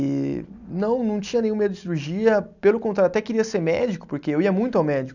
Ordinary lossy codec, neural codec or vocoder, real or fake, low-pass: none; none; real; 7.2 kHz